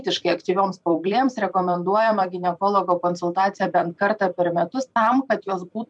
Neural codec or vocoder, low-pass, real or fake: vocoder, 44.1 kHz, 128 mel bands every 256 samples, BigVGAN v2; 10.8 kHz; fake